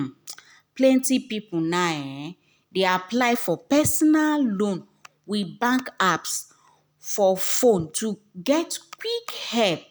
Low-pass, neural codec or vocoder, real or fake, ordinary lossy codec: none; none; real; none